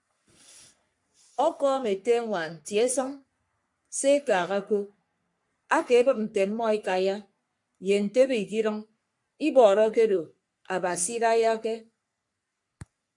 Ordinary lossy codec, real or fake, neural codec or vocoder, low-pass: MP3, 64 kbps; fake; codec, 44.1 kHz, 3.4 kbps, Pupu-Codec; 10.8 kHz